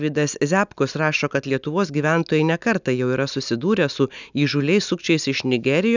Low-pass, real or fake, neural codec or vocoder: 7.2 kHz; real; none